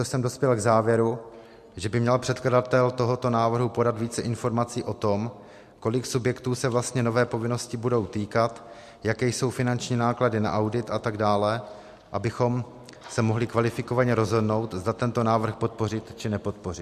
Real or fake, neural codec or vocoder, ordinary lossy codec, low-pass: real; none; MP3, 64 kbps; 14.4 kHz